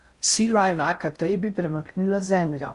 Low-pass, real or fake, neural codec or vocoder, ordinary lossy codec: 10.8 kHz; fake; codec, 16 kHz in and 24 kHz out, 0.6 kbps, FocalCodec, streaming, 4096 codes; Opus, 64 kbps